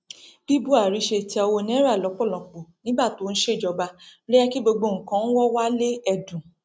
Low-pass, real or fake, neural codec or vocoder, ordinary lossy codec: none; real; none; none